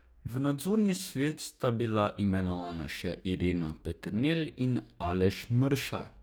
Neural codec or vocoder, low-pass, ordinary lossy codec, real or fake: codec, 44.1 kHz, 2.6 kbps, DAC; none; none; fake